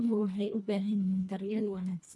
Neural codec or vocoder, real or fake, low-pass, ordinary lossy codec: codec, 24 kHz, 1.5 kbps, HILCodec; fake; none; none